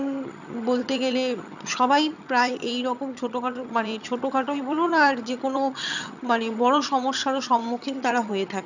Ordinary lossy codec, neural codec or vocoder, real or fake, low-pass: none; vocoder, 22.05 kHz, 80 mel bands, HiFi-GAN; fake; 7.2 kHz